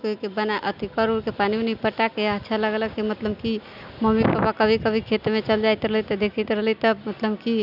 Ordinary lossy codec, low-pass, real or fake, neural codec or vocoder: none; 5.4 kHz; real; none